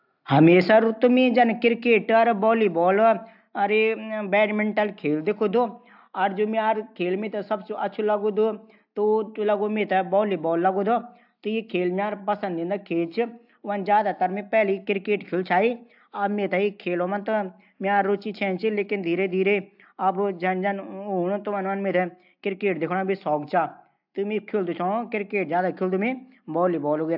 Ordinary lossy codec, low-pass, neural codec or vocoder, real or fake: AAC, 48 kbps; 5.4 kHz; none; real